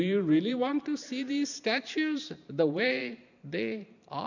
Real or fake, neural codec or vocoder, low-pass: fake; vocoder, 44.1 kHz, 128 mel bands every 512 samples, BigVGAN v2; 7.2 kHz